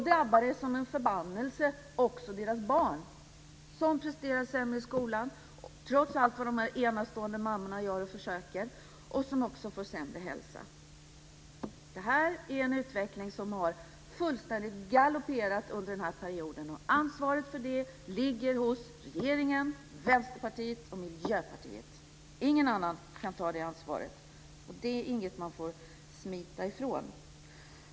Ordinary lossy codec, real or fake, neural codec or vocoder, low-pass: none; real; none; none